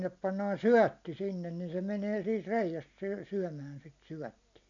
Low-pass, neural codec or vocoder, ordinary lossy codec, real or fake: 7.2 kHz; none; none; real